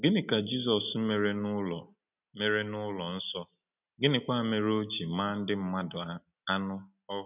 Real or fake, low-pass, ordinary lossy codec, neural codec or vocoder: real; 3.6 kHz; none; none